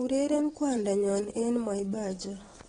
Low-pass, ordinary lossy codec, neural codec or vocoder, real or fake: 9.9 kHz; AAC, 32 kbps; vocoder, 22.05 kHz, 80 mel bands, WaveNeXt; fake